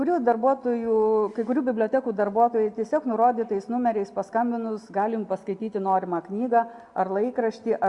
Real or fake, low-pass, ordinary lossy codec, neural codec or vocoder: real; 10.8 kHz; Opus, 64 kbps; none